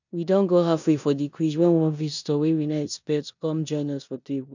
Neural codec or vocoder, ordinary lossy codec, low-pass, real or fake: codec, 16 kHz in and 24 kHz out, 0.9 kbps, LongCat-Audio-Codec, four codebook decoder; none; 7.2 kHz; fake